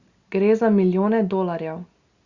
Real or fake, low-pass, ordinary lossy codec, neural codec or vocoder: real; 7.2 kHz; Opus, 64 kbps; none